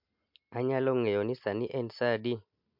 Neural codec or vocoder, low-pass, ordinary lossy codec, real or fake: none; 5.4 kHz; MP3, 48 kbps; real